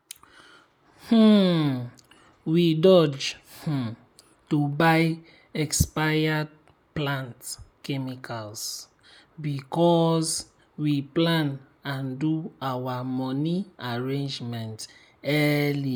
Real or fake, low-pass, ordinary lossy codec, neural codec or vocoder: real; none; none; none